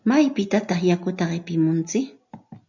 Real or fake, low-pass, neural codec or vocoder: real; 7.2 kHz; none